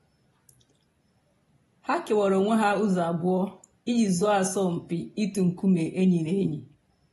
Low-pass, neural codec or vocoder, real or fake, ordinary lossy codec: 19.8 kHz; none; real; AAC, 32 kbps